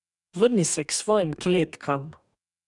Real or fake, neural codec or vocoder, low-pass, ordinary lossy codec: fake; codec, 44.1 kHz, 2.6 kbps, DAC; 10.8 kHz; none